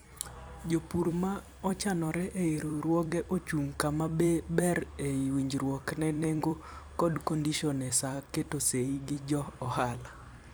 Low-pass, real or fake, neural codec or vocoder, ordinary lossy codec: none; fake; vocoder, 44.1 kHz, 128 mel bands every 256 samples, BigVGAN v2; none